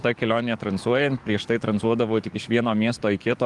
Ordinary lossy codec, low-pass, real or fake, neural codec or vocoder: Opus, 24 kbps; 10.8 kHz; fake; codec, 44.1 kHz, 7.8 kbps, DAC